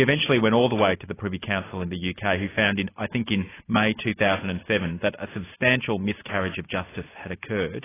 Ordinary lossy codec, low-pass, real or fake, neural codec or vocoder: AAC, 16 kbps; 3.6 kHz; real; none